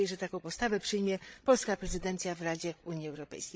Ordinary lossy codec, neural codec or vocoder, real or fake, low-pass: none; codec, 16 kHz, 16 kbps, FreqCodec, larger model; fake; none